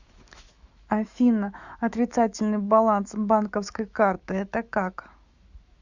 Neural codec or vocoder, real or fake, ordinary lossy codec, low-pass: autoencoder, 48 kHz, 128 numbers a frame, DAC-VAE, trained on Japanese speech; fake; Opus, 64 kbps; 7.2 kHz